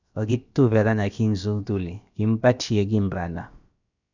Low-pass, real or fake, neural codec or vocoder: 7.2 kHz; fake; codec, 16 kHz, about 1 kbps, DyCAST, with the encoder's durations